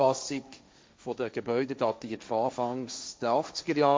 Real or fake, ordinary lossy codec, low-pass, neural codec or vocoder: fake; none; none; codec, 16 kHz, 1.1 kbps, Voila-Tokenizer